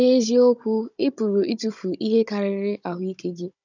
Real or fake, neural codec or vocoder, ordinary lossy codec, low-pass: fake; codec, 16 kHz, 16 kbps, FunCodec, trained on Chinese and English, 50 frames a second; none; 7.2 kHz